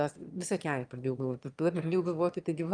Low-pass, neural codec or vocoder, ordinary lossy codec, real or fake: 9.9 kHz; autoencoder, 22.05 kHz, a latent of 192 numbers a frame, VITS, trained on one speaker; Opus, 32 kbps; fake